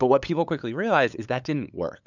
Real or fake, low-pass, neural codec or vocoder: fake; 7.2 kHz; codec, 16 kHz, 8 kbps, FunCodec, trained on LibriTTS, 25 frames a second